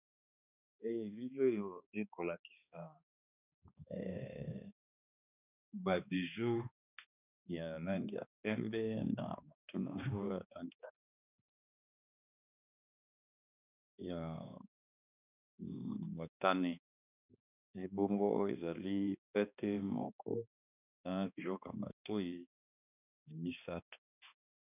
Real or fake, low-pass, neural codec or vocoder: fake; 3.6 kHz; codec, 16 kHz, 2 kbps, X-Codec, HuBERT features, trained on balanced general audio